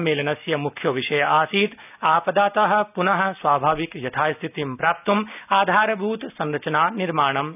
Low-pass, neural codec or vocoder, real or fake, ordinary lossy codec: 3.6 kHz; none; real; none